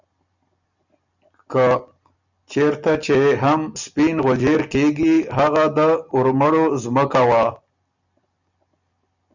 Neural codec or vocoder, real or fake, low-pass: none; real; 7.2 kHz